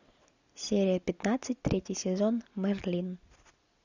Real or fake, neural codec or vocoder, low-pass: real; none; 7.2 kHz